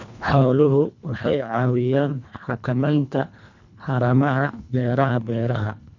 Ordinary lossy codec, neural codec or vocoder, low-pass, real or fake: none; codec, 24 kHz, 1.5 kbps, HILCodec; 7.2 kHz; fake